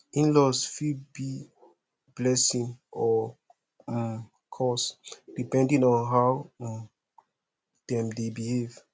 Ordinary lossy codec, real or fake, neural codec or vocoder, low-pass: none; real; none; none